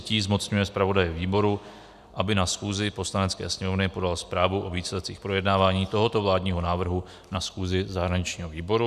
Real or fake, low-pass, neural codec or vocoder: real; 14.4 kHz; none